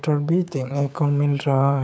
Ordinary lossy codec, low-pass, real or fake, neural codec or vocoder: none; none; fake; codec, 16 kHz, 6 kbps, DAC